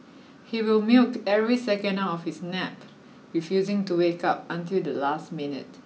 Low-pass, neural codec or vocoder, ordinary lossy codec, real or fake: none; none; none; real